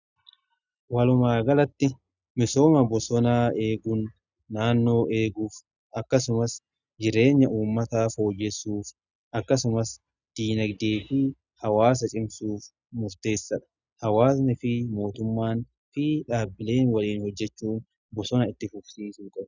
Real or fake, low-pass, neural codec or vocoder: real; 7.2 kHz; none